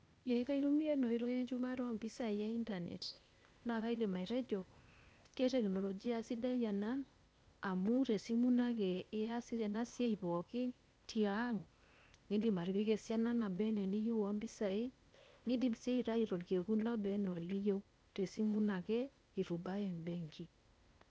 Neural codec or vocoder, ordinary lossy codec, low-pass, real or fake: codec, 16 kHz, 0.8 kbps, ZipCodec; none; none; fake